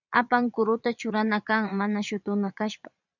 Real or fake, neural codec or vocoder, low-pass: fake; vocoder, 22.05 kHz, 80 mel bands, Vocos; 7.2 kHz